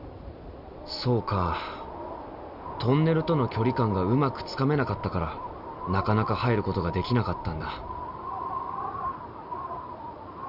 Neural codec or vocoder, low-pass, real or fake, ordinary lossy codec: none; 5.4 kHz; real; none